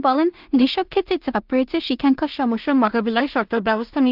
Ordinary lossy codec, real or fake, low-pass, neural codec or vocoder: Opus, 32 kbps; fake; 5.4 kHz; codec, 16 kHz in and 24 kHz out, 0.4 kbps, LongCat-Audio-Codec, two codebook decoder